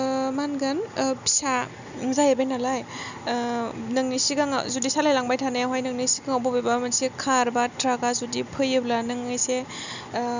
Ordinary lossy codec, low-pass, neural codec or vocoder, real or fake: none; 7.2 kHz; none; real